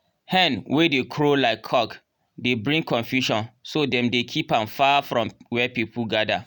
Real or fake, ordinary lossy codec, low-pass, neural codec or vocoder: real; none; 19.8 kHz; none